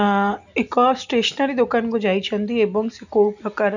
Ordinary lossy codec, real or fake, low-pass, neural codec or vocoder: none; real; 7.2 kHz; none